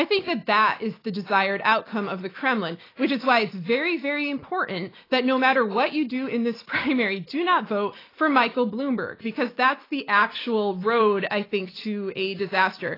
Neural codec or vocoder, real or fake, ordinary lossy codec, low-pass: none; real; AAC, 24 kbps; 5.4 kHz